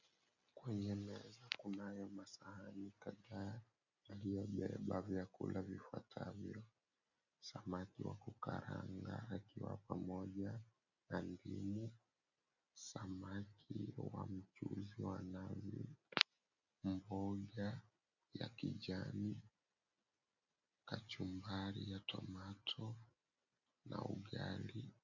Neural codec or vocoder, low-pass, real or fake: none; 7.2 kHz; real